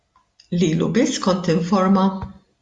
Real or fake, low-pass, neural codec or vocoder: real; 10.8 kHz; none